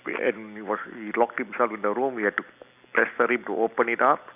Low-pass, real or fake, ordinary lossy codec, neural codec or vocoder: 3.6 kHz; real; none; none